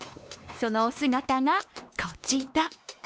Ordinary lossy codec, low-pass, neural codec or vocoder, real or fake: none; none; codec, 16 kHz, 2 kbps, X-Codec, WavLM features, trained on Multilingual LibriSpeech; fake